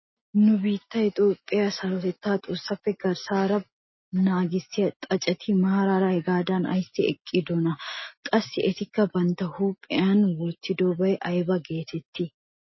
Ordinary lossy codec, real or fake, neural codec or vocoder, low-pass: MP3, 24 kbps; real; none; 7.2 kHz